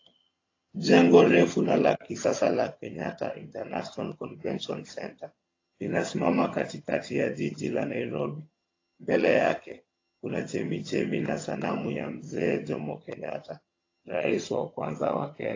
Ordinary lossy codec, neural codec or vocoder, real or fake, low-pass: AAC, 32 kbps; vocoder, 22.05 kHz, 80 mel bands, HiFi-GAN; fake; 7.2 kHz